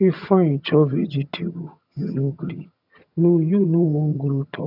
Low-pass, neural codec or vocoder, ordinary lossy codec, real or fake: 5.4 kHz; vocoder, 22.05 kHz, 80 mel bands, HiFi-GAN; none; fake